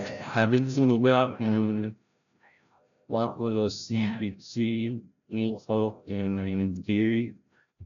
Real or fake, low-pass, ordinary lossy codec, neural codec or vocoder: fake; 7.2 kHz; none; codec, 16 kHz, 0.5 kbps, FreqCodec, larger model